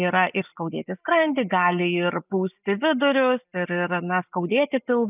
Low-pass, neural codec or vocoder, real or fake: 3.6 kHz; none; real